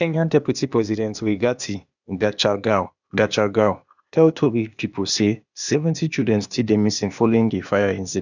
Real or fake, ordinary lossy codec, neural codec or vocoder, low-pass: fake; none; codec, 16 kHz, 0.8 kbps, ZipCodec; 7.2 kHz